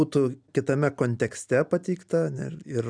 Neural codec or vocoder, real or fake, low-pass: none; real; 9.9 kHz